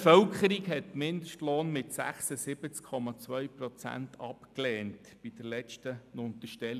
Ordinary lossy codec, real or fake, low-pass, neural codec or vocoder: none; real; 14.4 kHz; none